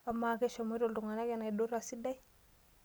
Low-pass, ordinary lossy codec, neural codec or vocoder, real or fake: none; none; none; real